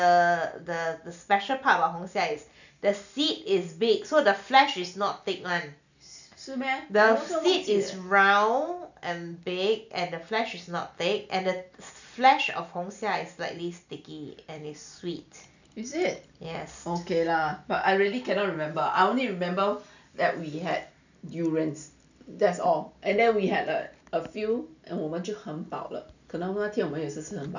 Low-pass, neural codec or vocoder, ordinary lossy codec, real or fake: 7.2 kHz; none; none; real